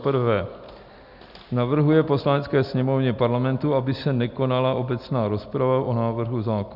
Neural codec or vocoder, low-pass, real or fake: none; 5.4 kHz; real